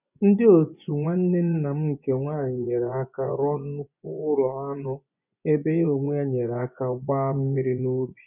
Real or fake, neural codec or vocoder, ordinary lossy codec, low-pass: fake; vocoder, 24 kHz, 100 mel bands, Vocos; none; 3.6 kHz